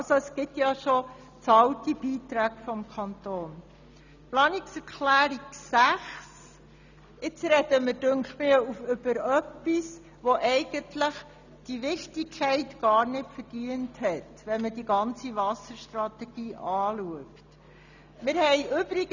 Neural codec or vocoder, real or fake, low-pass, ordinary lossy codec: none; real; 7.2 kHz; none